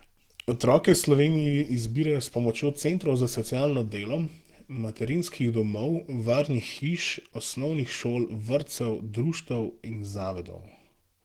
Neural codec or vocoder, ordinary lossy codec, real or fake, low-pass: autoencoder, 48 kHz, 128 numbers a frame, DAC-VAE, trained on Japanese speech; Opus, 16 kbps; fake; 19.8 kHz